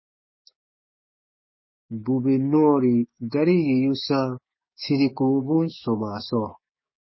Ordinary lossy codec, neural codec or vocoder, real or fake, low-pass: MP3, 24 kbps; codec, 16 kHz, 6 kbps, DAC; fake; 7.2 kHz